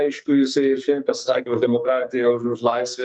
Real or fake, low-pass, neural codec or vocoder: fake; 9.9 kHz; codec, 44.1 kHz, 2.6 kbps, SNAC